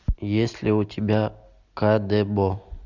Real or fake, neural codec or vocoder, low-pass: real; none; 7.2 kHz